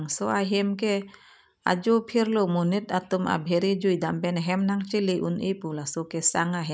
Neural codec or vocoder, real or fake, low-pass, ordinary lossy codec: none; real; none; none